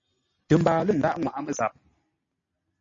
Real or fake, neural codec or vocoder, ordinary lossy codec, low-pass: real; none; MP3, 32 kbps; 7.2 kHz